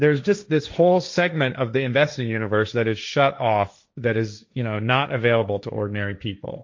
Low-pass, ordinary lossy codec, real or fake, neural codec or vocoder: 7.2 kHz; MP3, 48 kbps; fake; codec, 16 kHz, 1.1 kbps, Voila-Tokenizer